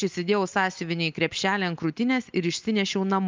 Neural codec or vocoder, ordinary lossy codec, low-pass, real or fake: none; Opus, 24 kbps; 7.2 kHz; real